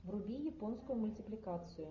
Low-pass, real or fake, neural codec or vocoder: 7.2 kHz; real; none